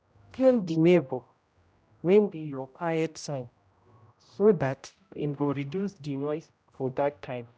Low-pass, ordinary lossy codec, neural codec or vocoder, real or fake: none; none; codec, 16 kHz, 0.5 kbps, X-Codec, HuBERT features, trained on general audio; fake